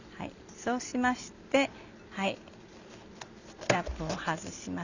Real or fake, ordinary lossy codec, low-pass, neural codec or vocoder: real; none; 7.2 kHz; none